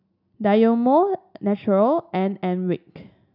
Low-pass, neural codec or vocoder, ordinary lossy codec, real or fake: 5.4 kHz; none; none; real